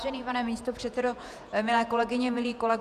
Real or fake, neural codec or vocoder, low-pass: fake; vocoder, 48 kHz, 128 mel bands, Vocos; 14.4 kHz